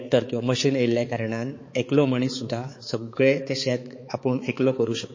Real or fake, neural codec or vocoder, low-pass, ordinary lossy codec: fake; codec, 16 kHz, 4 kbps, X-Codec, HuBERT features, trained on balanced general audio; 7.2 kHz; MP3, 32 kbps